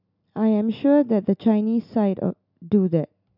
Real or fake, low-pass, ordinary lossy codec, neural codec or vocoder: real; 5.4 kHz; none; none